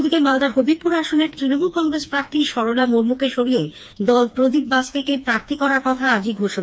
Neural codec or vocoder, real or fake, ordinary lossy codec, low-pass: codec, 16 kHz, 2 kbps, FreqCodec, smaller model; fake; none; none